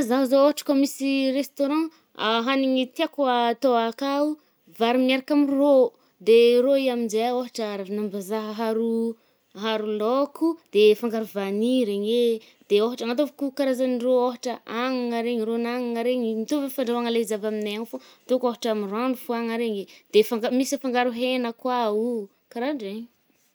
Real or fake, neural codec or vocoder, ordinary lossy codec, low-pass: real; none; none; none